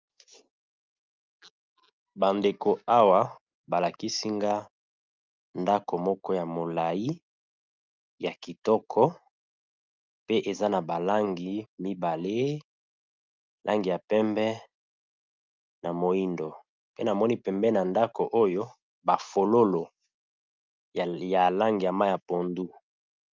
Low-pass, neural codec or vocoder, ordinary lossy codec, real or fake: 7.2 kHz; none; Opus, 24 kbps; real